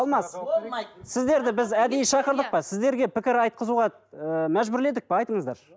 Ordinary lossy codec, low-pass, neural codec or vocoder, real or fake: none; none; none; real